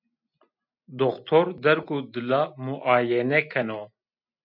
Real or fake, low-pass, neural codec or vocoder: real; 5.4 kHz; none